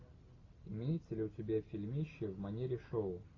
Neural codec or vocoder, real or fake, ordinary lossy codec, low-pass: none; real; AAC, 32 kbps; 7.2 kHz